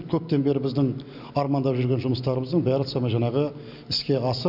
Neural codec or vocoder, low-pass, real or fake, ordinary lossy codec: none; 5.4 kHz; real; none